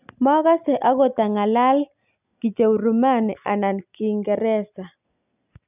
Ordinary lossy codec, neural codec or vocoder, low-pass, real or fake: none; none; 3.6 kHz; real